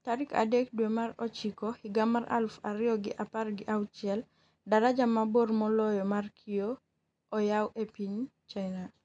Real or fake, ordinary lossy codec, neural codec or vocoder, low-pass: real; none; none; 9.9 kHz